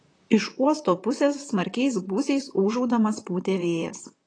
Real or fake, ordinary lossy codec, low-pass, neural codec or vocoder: fake; AAC, 32 kbps; 9.9 kHz; vocoder, 44.1 kHz, 128 mel bands, Pupu-Vocoder